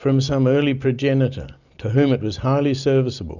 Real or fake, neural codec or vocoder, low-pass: real; none; 7.2 kHz